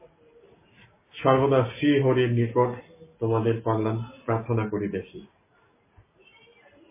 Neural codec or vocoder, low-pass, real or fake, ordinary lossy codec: none; 3.6 kHz; real; MP3, 16 kbps